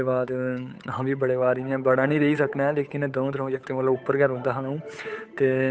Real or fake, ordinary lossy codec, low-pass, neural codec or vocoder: fake; none; none; codec, 16 kHz, 8 kbps, FunCodec, trained on Chinese and English, 25 frames a second